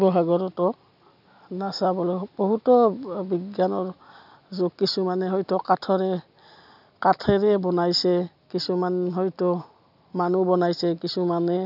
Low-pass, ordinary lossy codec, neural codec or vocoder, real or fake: 5.4 kHz; none; none; real